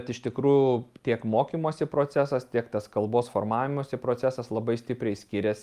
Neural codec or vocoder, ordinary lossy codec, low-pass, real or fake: none; Opus, 32 kbps; 14.4 kHz; real